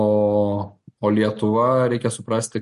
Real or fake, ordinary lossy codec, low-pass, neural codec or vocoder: real; MP3, 48 kbps; 14.4 kHz; none